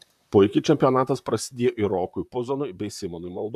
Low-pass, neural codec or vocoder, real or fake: 14.4 kHz; vocoder, 44.1 kHz, 128 mel bands, Pupu-Vocoder; fake